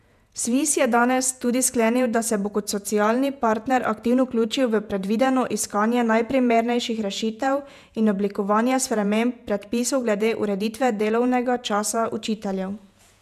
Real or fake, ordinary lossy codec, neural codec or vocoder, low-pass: fake; none; vocoder, 48 kHz, 128 mel bands, Vocos; 14.4 kHz